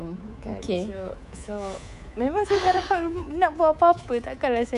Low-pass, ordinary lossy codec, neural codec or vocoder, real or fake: 10.8 kHz; none; codec, 24 kHz, 3.1 kbps, DualCodec; fake